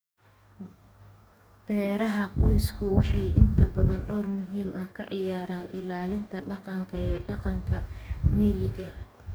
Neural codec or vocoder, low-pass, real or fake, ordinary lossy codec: codec, 44.1 kHz, 2.6 kbps, DAC; none; fake; none